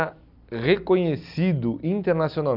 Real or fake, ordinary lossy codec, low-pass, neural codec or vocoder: real; none; 5.4 kHz; none